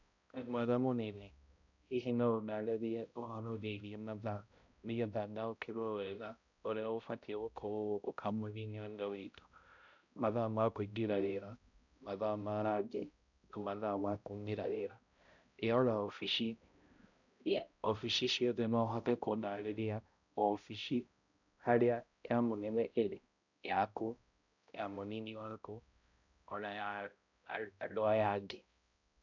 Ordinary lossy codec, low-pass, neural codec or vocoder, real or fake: none; 7.2 kHz; codec, 16 kHz, 0.5 kbps, X-Codec, HuBERT features, trained on balanced general audio; fake